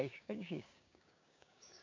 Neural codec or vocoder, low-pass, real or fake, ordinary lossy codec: codec, 16 kHz, 6 kbps, DAC; 7.2 kHz; fake; MP3, 64 kbps